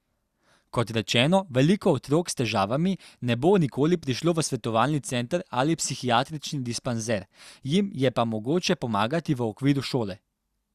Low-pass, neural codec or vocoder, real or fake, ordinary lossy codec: 14.4 kHz; none; real; Opus, 64 kbps